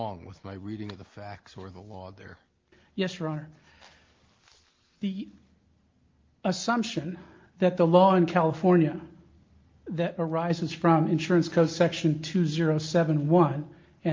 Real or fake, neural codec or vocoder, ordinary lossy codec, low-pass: real; none; Opus, 32 kbps; 7.2 kHz